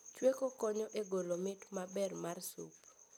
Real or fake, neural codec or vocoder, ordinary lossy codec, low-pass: real; none; none; none